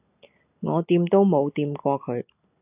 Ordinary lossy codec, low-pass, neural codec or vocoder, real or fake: AAC, 32 kbps; 3.6 kHz; none; real